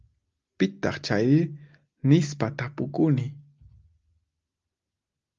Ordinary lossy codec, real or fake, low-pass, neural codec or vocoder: Opus, 32 kbps; real; 7.2 kHz; none